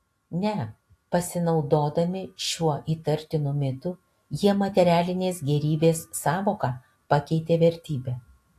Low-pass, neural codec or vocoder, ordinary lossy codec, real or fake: 14.4 kHz; none; AAC, 64 kbps; real